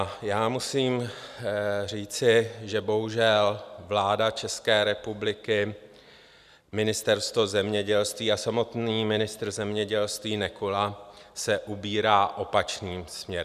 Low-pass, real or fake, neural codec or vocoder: 14.4 kHz; real; none